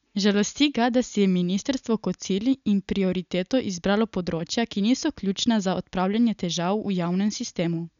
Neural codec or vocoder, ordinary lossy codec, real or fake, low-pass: none; none; real; 7.2 kHz